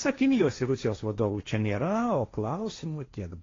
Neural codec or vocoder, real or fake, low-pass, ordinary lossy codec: codec, 16 kHz, 1.1 kbps, Voila-Tokenizer; fake; 7.2 kHz; AAC, 32 kbps